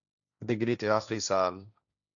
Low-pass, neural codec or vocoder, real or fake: 7.2 kHz; codec, 16 kHz, 1.1 kbps, Voila-Tokenizer; fake